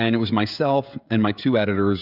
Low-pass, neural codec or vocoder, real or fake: 5.4 kHz; codec, 16 kHz, 16 kbps, FreqCodec, smaller model; fake